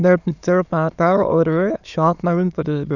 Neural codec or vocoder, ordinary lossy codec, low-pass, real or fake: autoencoder, 22.05 kHz, a latent of 192 numbers a frame, VITS, trained on many speakers; none; 7.2 kHz; fake